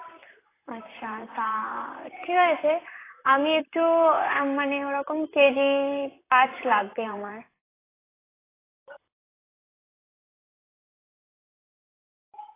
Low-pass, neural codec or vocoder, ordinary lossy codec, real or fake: 3.6 kHz; vocoder, 44.1 kHz, 128 mel bands, Pupu-Vocoder; AAC, 16 kbps; fake